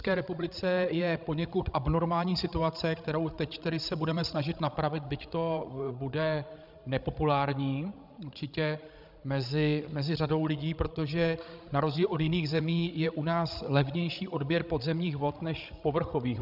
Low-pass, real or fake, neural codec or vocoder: 5.4 kHz; fake; codec, 16 kHz, 16 kbps, FreqCodec, larger model